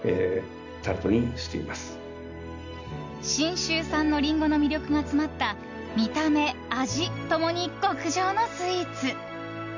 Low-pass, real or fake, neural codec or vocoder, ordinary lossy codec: 7.2 kHz; real; none; none